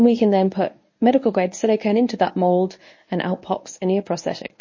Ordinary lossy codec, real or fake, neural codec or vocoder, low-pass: MP3, 32 kbps; fake; codec, 24 kHz, 0.9 kbps, WavTokenizer, medium speech release version 1; 7.2 kHz